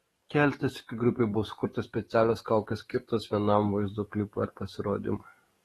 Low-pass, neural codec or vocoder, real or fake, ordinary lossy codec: 19.8 kHz; codec, 44.1 kHz, 7.8 kbps, DAC; fake; AAC, 32 kbps